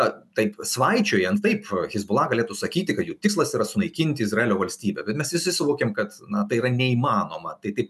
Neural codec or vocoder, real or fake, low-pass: none; real; 14.4 kHz